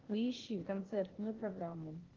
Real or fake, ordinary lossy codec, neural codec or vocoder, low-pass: fake; Opus, 16 kbps; codec, 16 kHz, 0.8 kbps, ZipCodec; 7.2 kHz